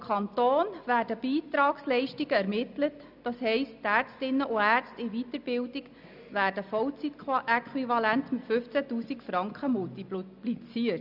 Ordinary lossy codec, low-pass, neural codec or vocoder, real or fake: none; 5.4 kHz; none; real